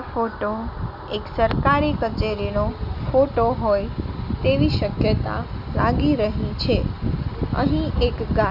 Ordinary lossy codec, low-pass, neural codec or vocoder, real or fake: none; 5.4 kHz; none; real